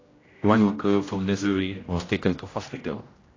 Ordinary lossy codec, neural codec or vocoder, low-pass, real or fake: AAC, 32 kbps; codec, 16 kHz, 0.5 kbps, X-Codec, HuBERT features, trained on general audio; 7.2 kHz; fake